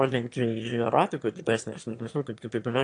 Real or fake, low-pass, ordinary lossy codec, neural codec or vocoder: fake; 9.9 kHz; AAC, 48 kbps; autoencoder, 22.05 kHz, a latent of 192 numbers a frame, VITS, trained on one speaker